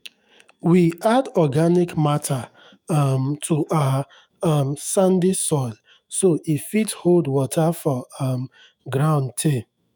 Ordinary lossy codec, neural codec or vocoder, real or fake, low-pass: none; autoencoder, 48 kHz, 128 numbers a frame, DAC-VAE, trained on Japanese speech; fake; none